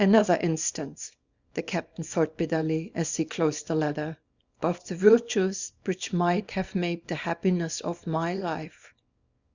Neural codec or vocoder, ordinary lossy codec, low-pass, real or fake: codec, 24 kHz, 0.9 kbps, WavTokenizer, small release; Opus, 64 kbps; 7.2 kHz; fake